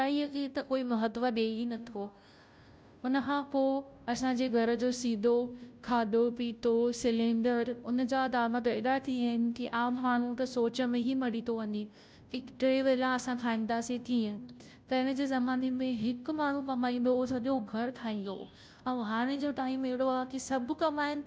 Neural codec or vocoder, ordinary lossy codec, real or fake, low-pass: codec, 16 kHz, 0.5 kbps, FunCodec, trained on Chinese and English, 25 frames a second; none; fake; none